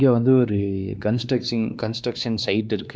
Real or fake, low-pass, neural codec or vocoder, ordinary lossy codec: fake; none; codec, 16 kHz, 2 kbps, X-Codec, WavLM features, trained on Multilingual LibriSpeech; none